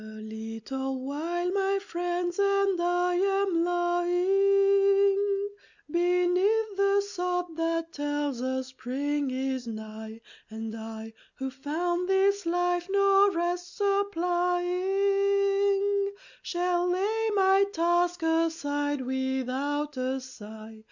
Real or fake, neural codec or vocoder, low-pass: real; none; 7.2 kHz